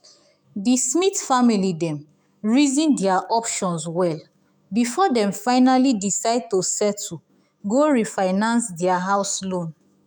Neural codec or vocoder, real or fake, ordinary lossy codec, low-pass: autoencoder, 48 kHz, 128 numbers a frame, DAC-VAE, trained on Japanese speech; fake; none; none